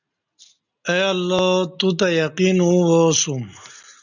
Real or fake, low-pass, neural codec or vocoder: real; 7.2 kHz; none